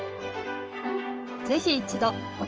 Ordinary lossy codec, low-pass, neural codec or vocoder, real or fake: Opus, 24 kbps; 7.2 kHz; codec, 16 kHz in and 24 kHz out, 1 kbps, XY-Tokenizer; fake